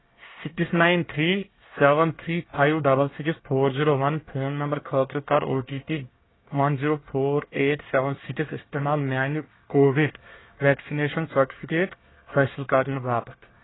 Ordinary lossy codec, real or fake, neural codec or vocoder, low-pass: AAC, 16 kbps; fake; codec, 24 kHz, 1 kbps, SNAC; 7.2 kHz